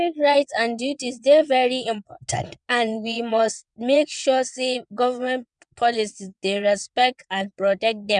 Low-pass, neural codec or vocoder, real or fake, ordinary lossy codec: 9.9 kHz; vocoder, 22.05 kHz, 80 mel bands, WaveNeXt; fake; none